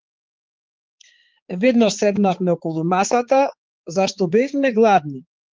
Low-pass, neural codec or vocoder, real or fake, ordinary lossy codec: 7.2 kHz; codec, 16 kHz, 4 kbps, X-Codec, HuBERT features, trained on balanced general audio; fake; Opus, 16 kbps